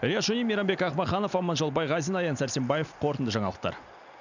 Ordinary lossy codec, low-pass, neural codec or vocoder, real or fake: none; 7.2 kHz; none; real